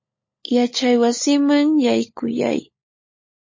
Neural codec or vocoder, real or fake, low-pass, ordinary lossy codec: codec, 16 kHz, 16 kbps, FunCodec, trained on LibriTTS, 50 frames a second; fake; 7.2 kHz; MP3, 32 kbps